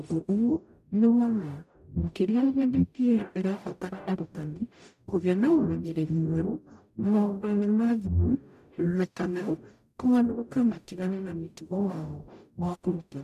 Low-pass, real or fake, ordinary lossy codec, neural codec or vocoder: 14.4 kHz; fake; none; codec, 44.1 kHz, 0.9 kbps, DAC